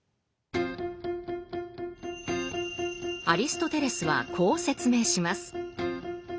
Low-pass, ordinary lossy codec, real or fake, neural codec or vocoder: none; none; real; none